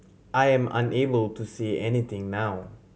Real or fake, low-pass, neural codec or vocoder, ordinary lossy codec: real; none; none; none